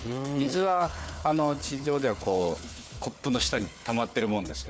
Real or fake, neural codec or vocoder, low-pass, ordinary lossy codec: fake; codec, 16 kHz, 4 kbps, FunCodec, trained on Chinese and English, 50 frames a second; none; none